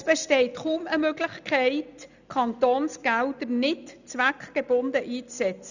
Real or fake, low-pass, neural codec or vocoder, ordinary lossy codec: real; 7.2 kHz; none; none